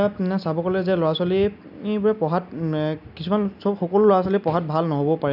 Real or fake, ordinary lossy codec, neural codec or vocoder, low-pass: real; none; none; 5.4 kHz